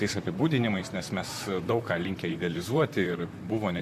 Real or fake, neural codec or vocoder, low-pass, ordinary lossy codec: fake; vocoder, 44.1 kHz, 128 mel bands, Pupu-Vocoder; 14.4 kHz; MP3, 64 kbps